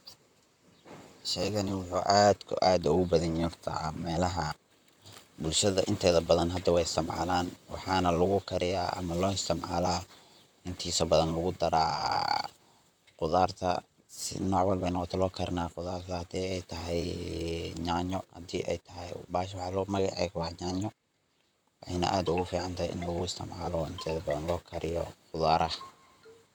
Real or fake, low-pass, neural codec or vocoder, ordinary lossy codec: fake; none; vocoder, 44.1 kHz, 128 mel bands, Pupu-Vocoder; none